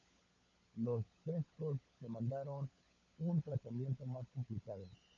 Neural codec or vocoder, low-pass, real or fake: codec, 16 kHz, 16 kbps, FunCodec, trained on LibriTTS, 50 frames a second; 7.2 kHz; fake